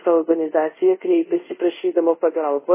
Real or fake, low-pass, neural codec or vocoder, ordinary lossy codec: fake; 3.6 kHz; codec, 24 kHz, 0.5 kbps, DualCodec; MP3, 16 kbps